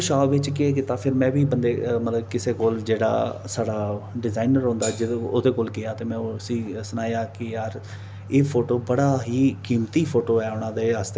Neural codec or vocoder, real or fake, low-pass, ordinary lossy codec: none; real; none; none